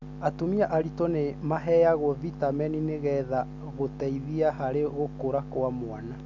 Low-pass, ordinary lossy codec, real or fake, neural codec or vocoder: 7.2 kHz; none; real; none